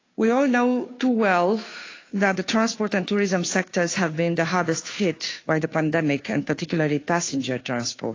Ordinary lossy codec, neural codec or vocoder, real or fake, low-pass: AAC, 32 kbps; codec, 16 kHz, 2 kbps, FunCodec, trained on Chinese and English, 25 frames a second; fake; 7.2 kHz